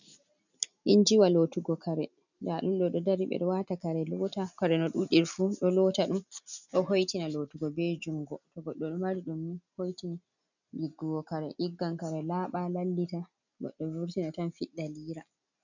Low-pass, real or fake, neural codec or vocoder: 7.2 kHz; real; none